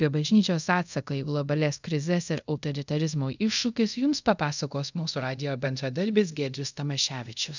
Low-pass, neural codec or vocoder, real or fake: 7.2 kHz; codec, 24 kHz, 0.5 kbps, DualCodec; fake